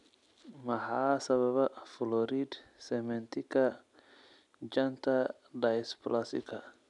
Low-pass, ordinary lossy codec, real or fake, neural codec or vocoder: 10.8 kHz; none; real; none